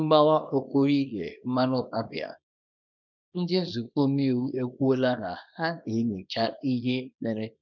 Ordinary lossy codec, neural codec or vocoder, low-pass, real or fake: none; codec, 24 kHz, 0.9 kbps, WavTokenizer, small release; 7.2 kHz; fake